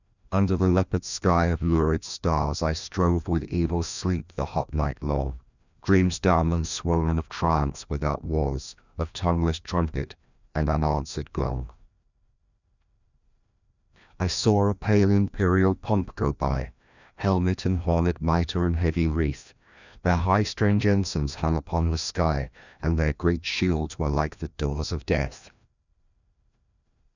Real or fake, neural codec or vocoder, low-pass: fake; codec, 16 kHz, 1 kbps, FreqCodec, larger model; 7.2 kHz